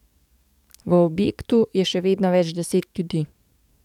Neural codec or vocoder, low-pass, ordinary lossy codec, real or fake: codec, 44.1 kHz, 7.8 kbps, DAC; 19.8 kHz; none; fake